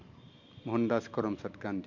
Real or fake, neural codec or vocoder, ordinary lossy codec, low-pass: real; none; MP3, 64 kbps; 7.2 kHz